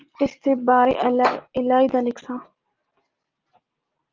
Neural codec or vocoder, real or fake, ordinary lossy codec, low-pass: none; real; Opus, 32 kbps; 7.2 kHz